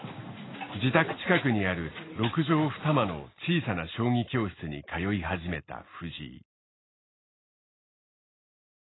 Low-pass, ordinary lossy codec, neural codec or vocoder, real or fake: 7.2 kHz; AAC, 16 kbps; none; real